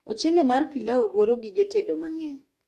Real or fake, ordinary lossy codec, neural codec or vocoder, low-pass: fake; AAC, 64 kbps; codec, 44.1 kHz, 2.6 kbps, DAC; 14.4 kHz